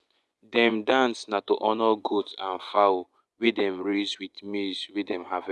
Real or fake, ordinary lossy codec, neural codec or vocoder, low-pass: fake; none; vocoder, 24 kHz, 100 mel bands, Vocos; none